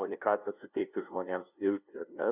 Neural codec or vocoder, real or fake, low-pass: codec, 16 kHz, 0.5 kbps, FunCodec, trained on LibriTTS, 25 frames a second; fake; 3.6 kHz